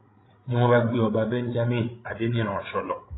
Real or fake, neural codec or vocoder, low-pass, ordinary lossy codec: fake; codec, 16 kHz, 16 kbps, FreqCodec, larger model; 7.2 kHz; AAC, 16 kbps